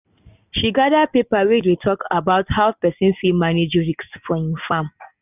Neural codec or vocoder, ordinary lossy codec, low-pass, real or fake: none; none; 3.6 kHz; real